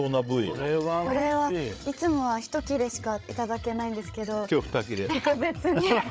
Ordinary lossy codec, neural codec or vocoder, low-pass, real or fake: none; codec, 16 kHz, 8 kbps, FreqCodec, larger model; none; fake